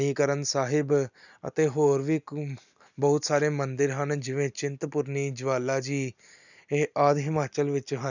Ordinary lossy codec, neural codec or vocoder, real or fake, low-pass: none; none; real; 7.2 kHz